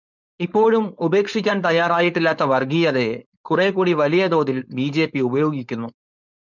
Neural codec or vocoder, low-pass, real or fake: codec, 16 kHz, 4.8 kbps, FACodec; 7.2 kHz; fake